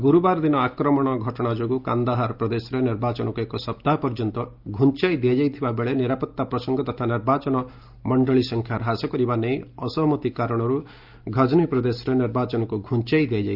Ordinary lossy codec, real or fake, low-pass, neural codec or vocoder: Opus, 24 kbps; real; 5.4 kHz; none